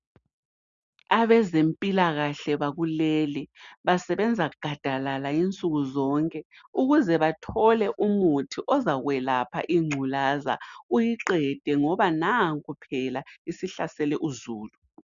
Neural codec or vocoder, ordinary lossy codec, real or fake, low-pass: none; MP3, 96 kbps; real; 7.2 kHz